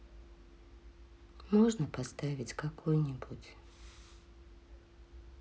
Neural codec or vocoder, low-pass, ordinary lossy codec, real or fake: none; none; none; real